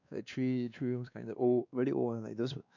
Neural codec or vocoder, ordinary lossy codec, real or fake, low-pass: codec, 16 kHz, 2 kbps, X-Codec, WavLM features, trained on Multilingual LibriSpeech; none; fake; 7.2 kHz